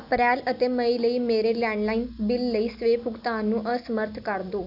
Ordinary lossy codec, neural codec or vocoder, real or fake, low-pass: none; none; real; 5.4 kHz